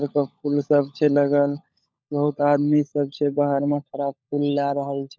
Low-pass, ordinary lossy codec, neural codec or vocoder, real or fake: none; none; codec, 16 kHz, 16 kbps, FunCodec, trained on LibriTTS, 50 frames a second; fake